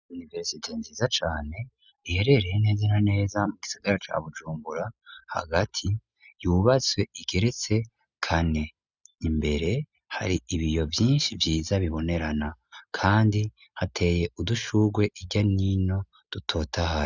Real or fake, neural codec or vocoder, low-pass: real; none; 7.2 kHz